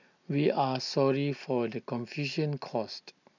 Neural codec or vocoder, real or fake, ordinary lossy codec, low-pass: none; real; none; 7.2 kHz